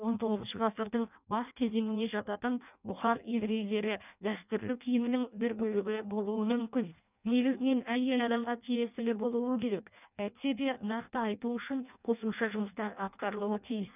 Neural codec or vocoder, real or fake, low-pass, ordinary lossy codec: codec, 16 kHz in and 24 kHz out, 0.6 kbps, FireRedTTS-2 codec; fake; 3.6 kHz; none